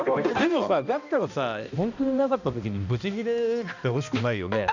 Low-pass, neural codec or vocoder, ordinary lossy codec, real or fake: 7.2 kHz; codec, 16 kHz, 1 kbps, X-Codec, HuBERT features, trained on balanced general audio; none; fake